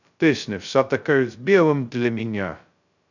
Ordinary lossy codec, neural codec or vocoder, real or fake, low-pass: none; codec, 16 kHz, 0.2 kbps, FocalCodec; fake; 7.2 kHz